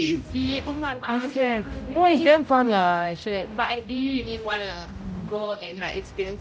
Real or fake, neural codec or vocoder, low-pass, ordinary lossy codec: fake; codec, 16 kHz, 0.5 kbps, X-Codec, HuBERT features, trained on general audio; none; none